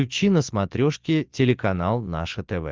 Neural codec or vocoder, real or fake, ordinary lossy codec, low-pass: none; real; Opus, 24 kbps; 7.2 kHz